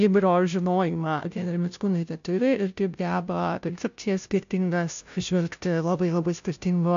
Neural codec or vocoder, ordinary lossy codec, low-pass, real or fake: codec, 16 kHz, 0.5 kbps, FunCodec, trained on Chinese and English, 25 frames a second; AAC, 64 kbps; 7.2 kHz; fake